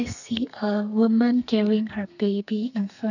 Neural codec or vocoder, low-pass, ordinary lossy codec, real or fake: codec, 32 kHz, 1.9 kbps, SNAC; 7.2 kHz; none; fake